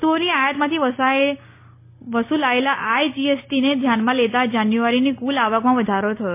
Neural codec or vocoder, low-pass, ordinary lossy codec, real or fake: none; 3.6 kHz; MP3, 24 kbps; real